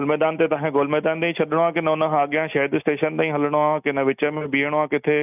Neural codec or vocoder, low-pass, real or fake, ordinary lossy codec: none; 3.6 kHz; real; none